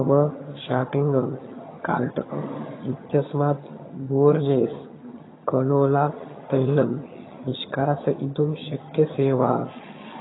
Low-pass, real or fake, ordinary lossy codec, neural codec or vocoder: 7.2 kHz; fake; AAC, 16 kbps; vocoder, 22.05 kHz, 80 mel bands, HiFi-GAN